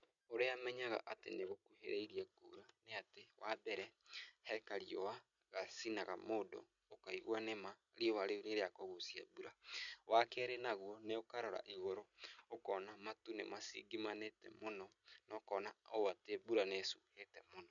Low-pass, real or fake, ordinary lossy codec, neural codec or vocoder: 7.2 kHz; real; none; none